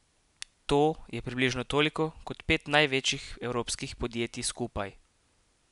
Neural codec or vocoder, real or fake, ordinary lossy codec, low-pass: none; real; none; 10.8 kHz